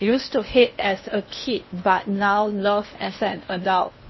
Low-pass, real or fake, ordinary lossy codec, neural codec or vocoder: 7.2 kHz; fake; MP3, 24 kbps; codec, 16 kHz in and 24 kHz out, 0.8 kbps, FocalCodec, streaming, 65536 codes